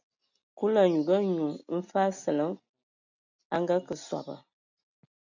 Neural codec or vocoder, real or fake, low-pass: none; real; 7.2 kHz